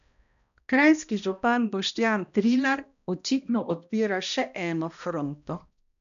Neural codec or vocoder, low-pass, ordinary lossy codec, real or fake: codec, 16 kHz, 1 kbps, X-Codec, HuBERT features, trained on balanced general audio; 7.2 kHz; none; fake